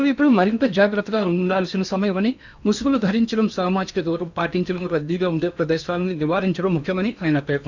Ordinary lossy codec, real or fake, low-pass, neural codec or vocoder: none; fake; 7.2 kHz; codec, 16 kHz in and 24 kHz out, 0.8 kbps, FocalCodec, streaming, 65536 codes